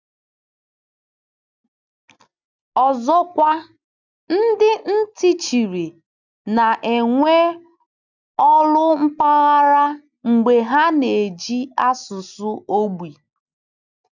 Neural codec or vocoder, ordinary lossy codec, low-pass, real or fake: none; none; 7.2 kHz; real